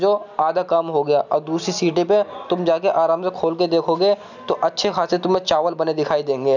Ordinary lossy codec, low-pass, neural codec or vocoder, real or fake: none; 7.2 kHz; none; real